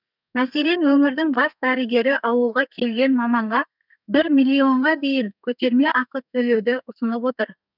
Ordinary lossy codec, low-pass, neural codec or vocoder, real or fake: none; 5.4 kHz; codec, 32 kHz, 1.9 kbps, SNAC; fake